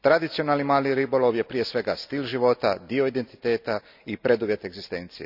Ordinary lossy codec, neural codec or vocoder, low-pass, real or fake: none; none; 5.4 kHz; real